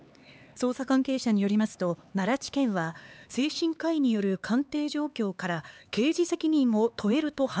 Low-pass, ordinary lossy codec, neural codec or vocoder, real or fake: none; none; codec, 16 kHz, 4 kbps, X-Codec, HuBERT features, trained on LibriSpeech; fake